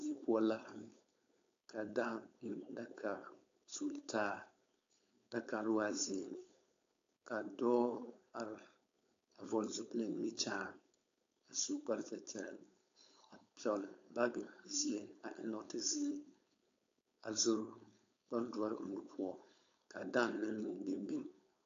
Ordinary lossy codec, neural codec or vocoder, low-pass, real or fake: AAC, 48 kbps; codec, 16 kHz, 4.8 kbps, FACodec; 7.2 kHz; fake